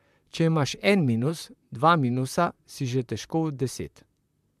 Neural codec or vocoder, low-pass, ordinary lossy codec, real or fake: vocoder, 44.1 kHz, 128 mel bands, Pupu-Vocoder; 14.4 kHz; MP3, 96 kbps; fake